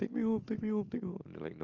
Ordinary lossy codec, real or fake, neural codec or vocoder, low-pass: Opus, 24 kbps; real; none; 7.2 kHz